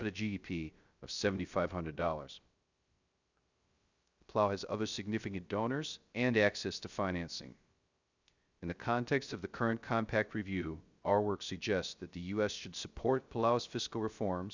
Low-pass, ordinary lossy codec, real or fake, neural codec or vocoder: 7.2 kHz; Opus, 64 kbps; fake; codec, 16 kHz, 0.3 kbps, FocalCodec